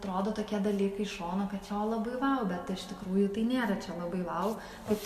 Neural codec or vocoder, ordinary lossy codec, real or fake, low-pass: none; MP3, 64 kbps; real; 14.4 kHz